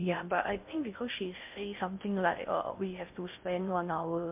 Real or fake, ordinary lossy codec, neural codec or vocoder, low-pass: fake; MP3, 32 kbps; codec, 16 kHz in and 24 kHz out, 0.6 kbps, FocalCodec, streaming, 2048 codes; 3.6 kHz